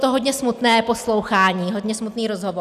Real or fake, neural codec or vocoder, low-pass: real; none; 14.4 kHz